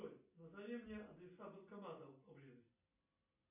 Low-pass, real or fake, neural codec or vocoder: 3.6 kHz; real; none